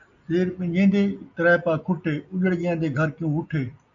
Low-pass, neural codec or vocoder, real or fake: 7.2 kHz; none; real